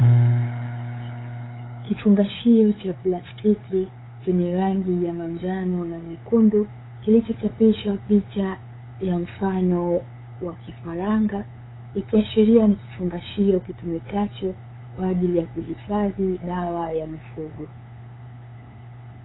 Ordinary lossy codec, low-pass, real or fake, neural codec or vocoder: AAC, 16 kbps; 7.2 kHz; fake; codec, 16 kHz, 8 kbps, FunCodec, trained on LibriTTS, 25 frames a second